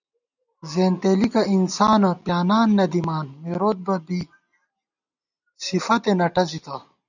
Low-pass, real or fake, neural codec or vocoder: 7.2 kHz; real; none